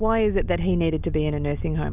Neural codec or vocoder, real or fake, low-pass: none; real; 3.6 kHz